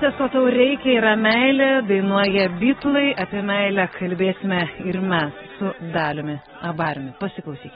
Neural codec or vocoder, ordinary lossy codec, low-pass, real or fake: none; AAC, 16 kbps; 19.8 kHz; real